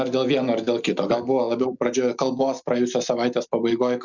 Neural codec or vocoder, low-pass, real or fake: none; 7.2 kHz; real